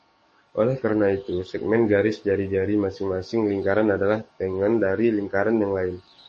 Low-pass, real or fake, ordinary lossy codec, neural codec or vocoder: 10.8 kHz; fake; MP3, 32 kbps; codec, 44.1 kHz, 7.8 kbps, DAC